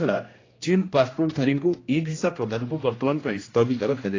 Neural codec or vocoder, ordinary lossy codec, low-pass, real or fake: codec, 16 kHz, 1 kbps, X-Codec, HuBERT features, trained on general audio; AAC, 32 kbps; 7.2 kHz; fake